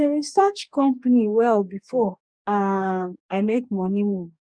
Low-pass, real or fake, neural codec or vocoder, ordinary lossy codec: 9.9 kHz; fake; codec, 44.1 kHz, 2.6 kbps, DAC; none